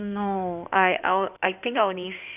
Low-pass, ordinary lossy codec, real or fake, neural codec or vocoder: 3.6 kHz; none; fake; codec, 16 kHz in and 24 kHz out, 2.2 kbps, FireRedTTS-2 codec